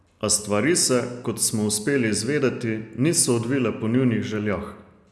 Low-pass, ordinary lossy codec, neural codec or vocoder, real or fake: none; none; none; real